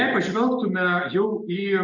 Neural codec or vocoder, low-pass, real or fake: none; 7.2 kHz; real